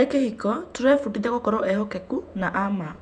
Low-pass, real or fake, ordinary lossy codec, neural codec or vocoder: 10.8 kHz; fake; none; vocoder, 48 kHz, 128 mel bands, Vocos